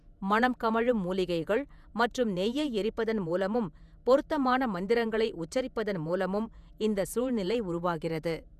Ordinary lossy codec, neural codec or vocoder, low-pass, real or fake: none; vocoder, 48 kHz, 128 mel bands, Vocos; 14.4 kHz; fake